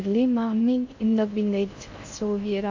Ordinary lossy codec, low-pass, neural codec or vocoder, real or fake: MP3, 48 kbps; 7.2 kHz; codec, 16 kHz in and 24 kHz out, 0.6 kbps, FocalCodec, streaming, 2048 codes; fake